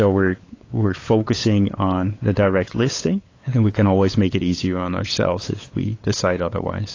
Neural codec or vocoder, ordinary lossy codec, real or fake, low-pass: codec, 16 kHz, 8 kbps, FunCodec, trained on LibriTTS, 25 frames a second; AAC, 32 kbps; fake; 7.2 kHz